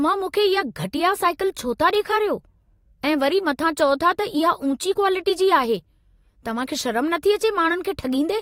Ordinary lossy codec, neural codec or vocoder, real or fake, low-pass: AAC, 48 kbps; vocoder, 44.1 kHz, 128 mel bands every 256 samples, BigVGAN v2; fake; 19.8 kHz